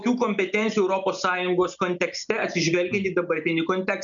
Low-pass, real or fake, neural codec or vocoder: 7.2 kHz; real; none